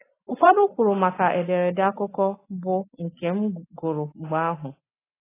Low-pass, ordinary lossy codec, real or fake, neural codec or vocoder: 3.6 kHz; AAC, 16 kbps; real; none